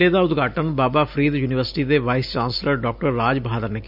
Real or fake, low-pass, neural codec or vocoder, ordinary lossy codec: real; 5.4 kHz; none; none